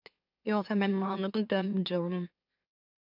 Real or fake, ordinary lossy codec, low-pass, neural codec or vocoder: fake; AAC, 48 kbps; 5.4 kHz; autoencoder, 44.1 kHz, a latent of 192 numbers a frame, MeloTTS